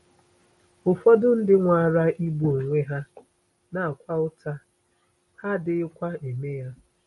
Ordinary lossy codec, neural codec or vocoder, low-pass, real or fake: MP3, 48 kbps; none; 19.8 kHz; real